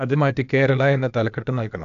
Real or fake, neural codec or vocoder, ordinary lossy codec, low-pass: fake; codec, 16 kHz, 0.8 kbps, ZipCodec; none; 7.2 kHz